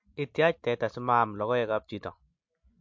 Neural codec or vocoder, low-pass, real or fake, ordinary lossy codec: none; 7.2 kHz; real; MP3, 48 kbps